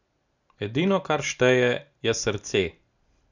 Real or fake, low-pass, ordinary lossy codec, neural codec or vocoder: real; 7.2 kHz; none; none